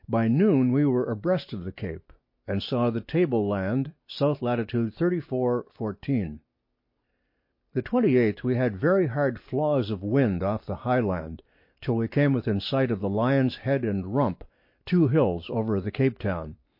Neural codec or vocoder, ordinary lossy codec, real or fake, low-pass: none; MP3, 32 kbps; real; 5.4 kHz